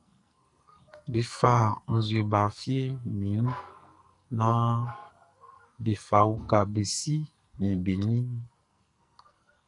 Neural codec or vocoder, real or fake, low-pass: codec, 44.1 kHz, 2.6 kbps, SNAC; fake; 10.8 kHz